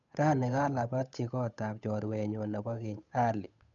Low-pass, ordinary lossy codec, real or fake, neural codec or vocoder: 7.2 kHz; none; fake; codec, 16 kHz, 8 kbps, FunCodec, trained on Chinese and English, 25 frames a second